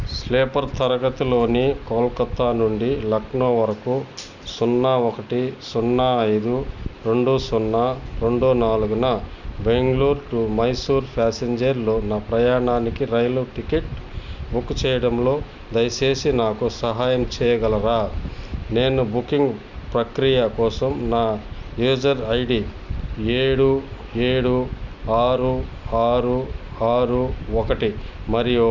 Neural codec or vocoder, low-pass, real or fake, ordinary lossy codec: none; 7.2 kHz; real; none